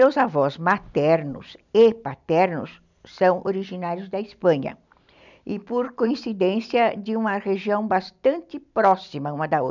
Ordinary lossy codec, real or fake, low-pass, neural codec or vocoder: none; real; 7.2 kHz; none